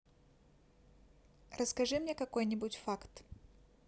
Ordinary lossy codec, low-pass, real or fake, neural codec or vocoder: none; none; real; none